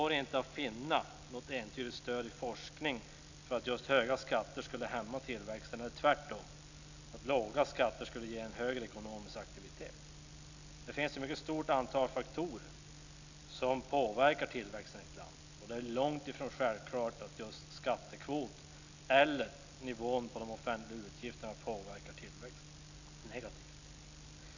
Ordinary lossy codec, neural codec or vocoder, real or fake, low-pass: none; none; real; 7.2 kHz